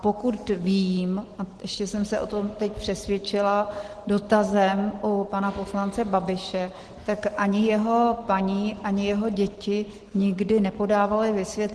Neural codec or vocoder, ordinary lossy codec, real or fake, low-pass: none; Opus, 16 kbps; real; 10.8 kHz